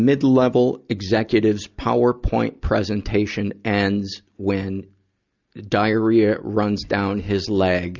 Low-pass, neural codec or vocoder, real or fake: 7.2 kHz; none; real